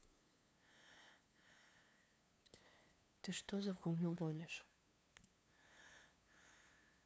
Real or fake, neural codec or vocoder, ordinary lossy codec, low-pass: fake; codec, 16 kHz, 2 kbps, FunCodec, trained on LibriTTS, 25 frames a second; none; none